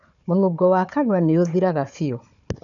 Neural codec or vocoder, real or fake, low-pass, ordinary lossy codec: codec, 16 kHz, 4 kbps, FunCodec, trained on Chinese and English, 50 frames a second; fake; 7.2 kHz; none